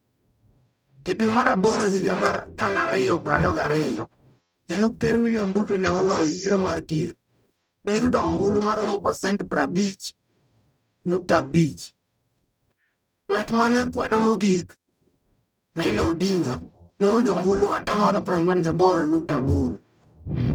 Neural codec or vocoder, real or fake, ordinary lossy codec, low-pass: codec, 44.1 kHz, 0.9 kbps, DAC; fake; none; 19.8 kHz